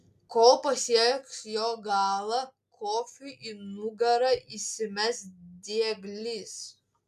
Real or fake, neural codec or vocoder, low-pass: real; none; 14.4 kHz